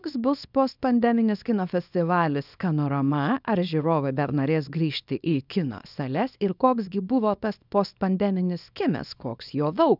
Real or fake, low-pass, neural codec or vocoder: fake; 5.4 kHz; codec, 24 kHz, 0.9 kbps, WavTokenizer, small release